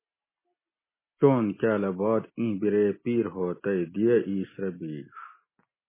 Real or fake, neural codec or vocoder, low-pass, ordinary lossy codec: real; none; 3.6 kHz; MP3, 16 kbps